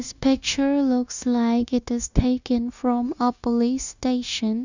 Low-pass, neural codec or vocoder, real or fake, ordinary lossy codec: 7.2 kHz; codec, 16 kHz, 0.9 kbps, LongCat-Audio-Codec; fake; none